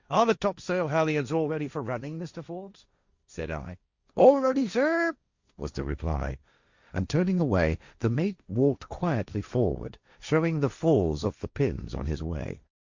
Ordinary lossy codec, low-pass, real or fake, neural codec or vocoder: Opus, 64 kbps; 7.2 kHz; fake; codec, 16 kHz, 1.1 kbps, Voila-Tokenizer